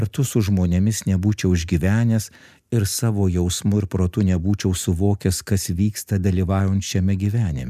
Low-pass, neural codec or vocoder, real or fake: 14.4 kHz; none; real